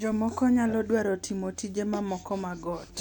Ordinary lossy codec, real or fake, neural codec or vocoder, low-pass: none; real; none; 19.8 kHz